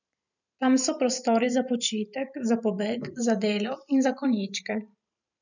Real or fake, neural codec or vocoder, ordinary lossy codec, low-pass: fake; vocoder, 44.1 kHz, 128 mel bands every 512 samples, BigVGAN v2; none; 7.2 kHz